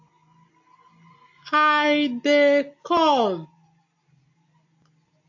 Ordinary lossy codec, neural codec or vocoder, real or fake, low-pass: AAC, 48 kbps; none; real; 7.2 kHz